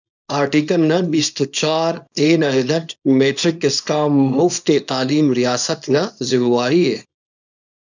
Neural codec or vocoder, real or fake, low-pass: codec, 24 kHz, 0.9 kbps, WavTokenizer, small release; fake; 7.2 kHz